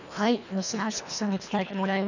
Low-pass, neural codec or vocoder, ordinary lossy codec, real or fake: 7.2 kHz; codec, 24 kHz, 1.5 kbps, HILCodec; none; fake